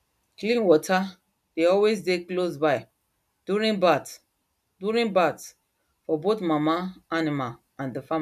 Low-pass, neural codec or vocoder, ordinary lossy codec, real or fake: 14.4 kHz; none; none; real